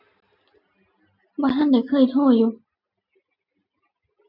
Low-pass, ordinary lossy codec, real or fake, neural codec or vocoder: 5.4 kHz; none; real; none